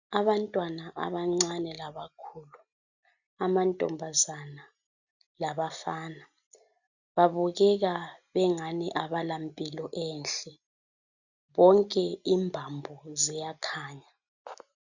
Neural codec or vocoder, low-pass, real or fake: none; 7.2 kHz; real